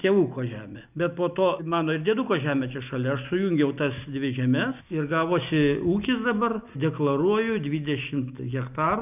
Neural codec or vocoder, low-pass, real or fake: none; 3.6 kHz; real